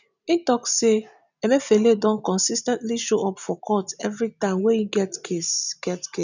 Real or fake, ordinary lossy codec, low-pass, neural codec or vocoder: real; none; 7.2 kHz; none